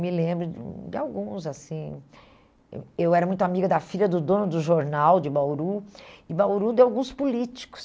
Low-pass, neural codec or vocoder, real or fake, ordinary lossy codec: none; none; real; none